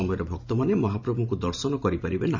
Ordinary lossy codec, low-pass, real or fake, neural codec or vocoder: none; 7.2 kHz; fake; vocoder, 44.1 kHz, 128 mel bands every 512 samples, BigVGAN v2